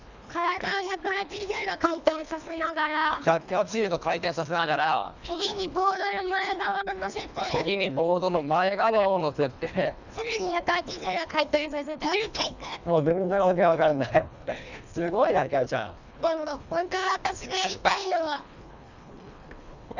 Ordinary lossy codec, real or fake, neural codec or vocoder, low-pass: none; fake; codec, 24 kHz, 1.5 kbps, HILCodec; 7.2 kHz